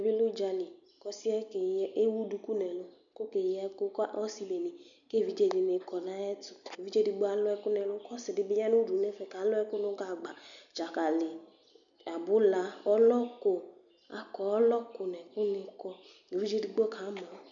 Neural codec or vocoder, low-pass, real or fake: none; 7.2 kHz; real